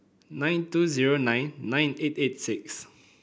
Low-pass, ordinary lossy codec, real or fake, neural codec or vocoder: none; none; real; none